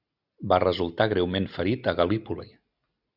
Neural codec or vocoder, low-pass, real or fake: none; 5.4 kHz; real